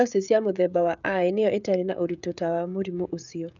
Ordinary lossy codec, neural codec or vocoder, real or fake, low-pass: none; codec, 16 kHz, 16 kbps, FreqCodec, smaller model; fake; 7.2 kHz